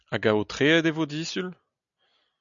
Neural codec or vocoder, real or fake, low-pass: none; real; 7.2 kHz